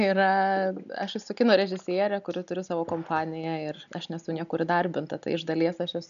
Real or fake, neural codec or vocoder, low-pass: real; none; 7.2 kHz